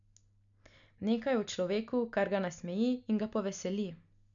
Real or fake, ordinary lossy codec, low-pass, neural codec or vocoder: real; none; 7.2 kHz; none